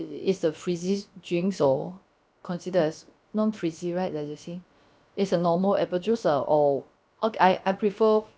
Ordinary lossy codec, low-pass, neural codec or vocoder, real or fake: none; none; codec, 16 kHz, about 1 kbps, DyCAST, with the encoder's durations; fake